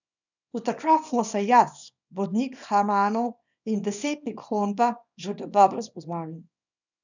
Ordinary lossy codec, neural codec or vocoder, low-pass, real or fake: none; codec, 24 kHz, 0.9 kbps, WavTokenizer, small release; 7.2 kHz; fake